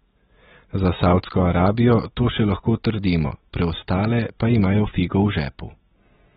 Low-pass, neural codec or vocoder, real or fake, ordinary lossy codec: 14.4 kHz; none; real; AAC, 16 kbps